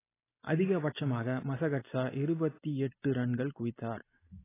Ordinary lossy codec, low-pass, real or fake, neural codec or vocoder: AAC, 16 kbps; 3.6 kHz; real; none